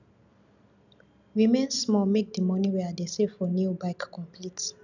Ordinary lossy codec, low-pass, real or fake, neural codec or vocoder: none; 7.2 kHz; real; none